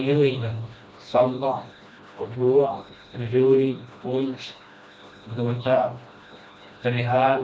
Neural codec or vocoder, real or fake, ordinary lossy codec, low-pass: codec, 16 kHz, 1 kbps, FreqCodec, smaller model; fake; none; none